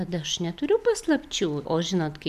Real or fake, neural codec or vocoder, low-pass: real; none; 14.4 kHz